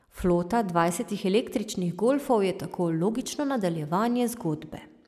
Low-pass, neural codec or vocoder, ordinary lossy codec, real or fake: 14.4 kHz; none; none; real